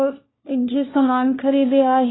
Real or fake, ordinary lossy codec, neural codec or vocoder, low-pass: fake; AAC, 16 kbps; codec, 16 kHz, 1 kbps, FunCodec, trained on LibriTTS, 50 frames a second; 7.2 kHz